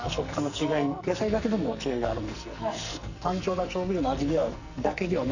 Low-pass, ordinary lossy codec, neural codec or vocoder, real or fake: 7.2 kHz; none; codec, 44.1 kHz, 2.6 kbps, SNAC; fake